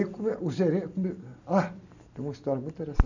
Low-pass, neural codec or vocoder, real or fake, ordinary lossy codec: 7.2 kHz; none; real; none